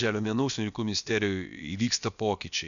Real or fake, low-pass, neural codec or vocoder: fake; 7.2 kHz; codec, 16 kHz, about 1 kbps, DyCAST, with the encoder's durations